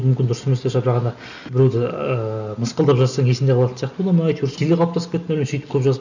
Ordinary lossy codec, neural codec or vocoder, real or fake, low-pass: none; none; real; 7.2 kHz